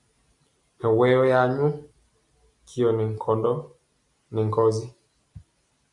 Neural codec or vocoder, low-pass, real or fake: none; 10.8 kHz; real